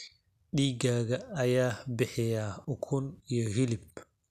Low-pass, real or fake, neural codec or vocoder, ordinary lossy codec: 14.4 kHz; real; none; none